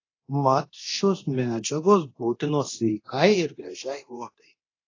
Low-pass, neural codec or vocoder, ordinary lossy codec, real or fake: 7.2 kHz; codec, 24 kHz, 0.9 kbps, DualCodec; AAC, 32 kbps; fake